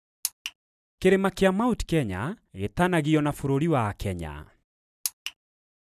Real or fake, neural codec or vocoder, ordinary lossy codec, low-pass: real; none; none; 14.4 kHz